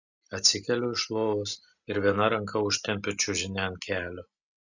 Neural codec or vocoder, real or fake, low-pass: none; real; 7.2 kHz